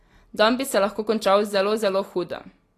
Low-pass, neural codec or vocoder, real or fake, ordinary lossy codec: 14.4 kHz; none; real; AAC, 48 kbps